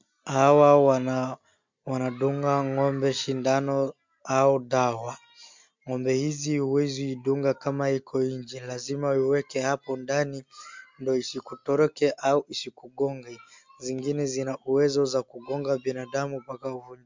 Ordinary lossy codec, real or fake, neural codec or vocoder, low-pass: MP3, 64 kbps; real; none; 7.2 kHz